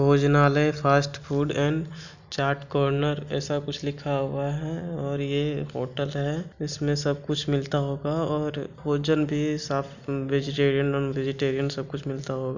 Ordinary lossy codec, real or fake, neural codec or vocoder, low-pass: none; real; none; 7.2 kHz